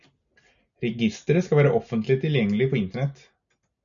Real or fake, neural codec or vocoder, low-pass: real; none; 7.2 kHz